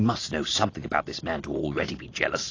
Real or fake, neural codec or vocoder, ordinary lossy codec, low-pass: real; none; AAC, 32 kbps; 7.2 kHz